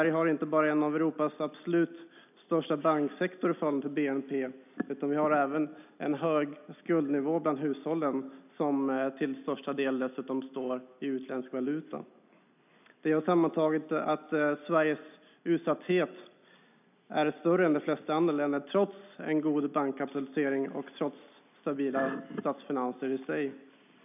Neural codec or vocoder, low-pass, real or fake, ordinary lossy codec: none; 3.6 kHz; real; none